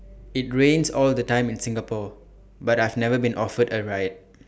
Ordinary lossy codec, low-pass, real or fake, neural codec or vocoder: none; none; real; none